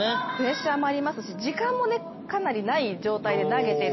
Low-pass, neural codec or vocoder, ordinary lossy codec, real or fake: 7.2 kHz; none; MP3, 24 kbps; real